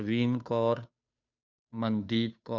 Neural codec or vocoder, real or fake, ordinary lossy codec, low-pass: codec, 16 kHz, 2 kbps, FunCodec, trained on Chinese and English, 25 frames a second; fake; none; 7.2 kHz